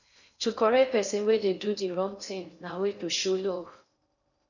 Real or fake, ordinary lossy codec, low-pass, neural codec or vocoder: fake; none; 7.2 kHz; codec, 16 kHz in and 24 kHz out, 0.6 kbps, FocalCodec, streaming, 4096 codes